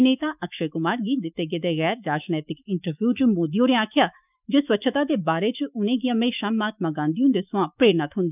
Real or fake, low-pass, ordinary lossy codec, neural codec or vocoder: fake; 3.6 kHz; none; autoencoder, 48 kHz, 128 numbers a frame, DAC-VAE, trained on Japanese speech